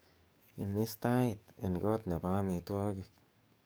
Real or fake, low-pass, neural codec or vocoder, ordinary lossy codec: fake; none; codec, 44.1 kHz, 7.8 kbps, DAC; none